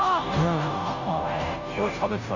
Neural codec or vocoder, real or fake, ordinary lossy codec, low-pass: codec, 16 kHz, 0.5 kbps, FunCodec, trained on Chinese and English, 25 frames a second; fake; none; 7.2 kHz